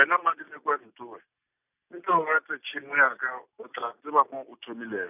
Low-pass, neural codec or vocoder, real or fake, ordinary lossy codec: 3.6 kHz; none; real; none